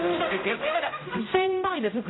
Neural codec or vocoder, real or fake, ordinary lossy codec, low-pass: codec, 16 kHz, 0.5 kbps, X-Codec, HuBERT features, trained on general audio; fake; AAC, 16 kbps; 7.2 kHz